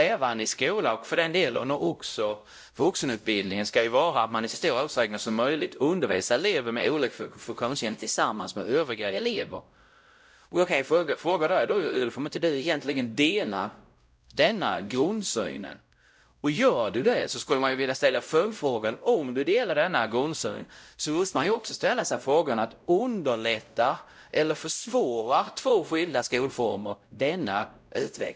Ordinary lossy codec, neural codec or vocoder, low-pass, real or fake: none; codec, 16 kHz, 0.5 kbps, X-Codec, WavLM features, trained on Multilingual LibriSpeech; none; fake